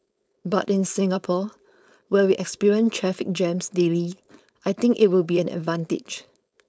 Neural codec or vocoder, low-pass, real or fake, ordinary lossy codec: codec, 16 kHz, 4.8 kbps, FACodec; none; fake; none